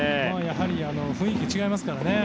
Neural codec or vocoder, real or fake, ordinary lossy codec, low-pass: none; real; none; none